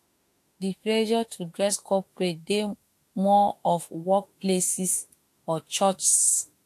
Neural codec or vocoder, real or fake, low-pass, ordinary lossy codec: autoencoder, 48 kHz, 32 numbers a frame, DAC-VAE, trained on Japanese speech; fake; 14.4 kHz; AAC, 64 kbps